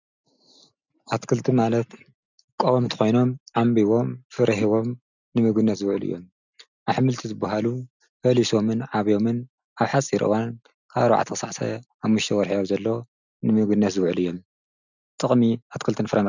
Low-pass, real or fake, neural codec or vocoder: 7.2 kHz; real; none